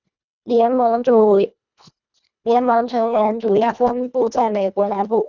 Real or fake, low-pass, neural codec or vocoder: fake; 7.2 kHz; codec, 24 kHz, 1.5 kbps, HILCodec